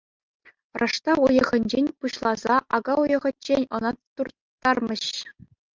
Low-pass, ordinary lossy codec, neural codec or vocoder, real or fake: 7.2 kHz; Opus, 32 kbps; none; real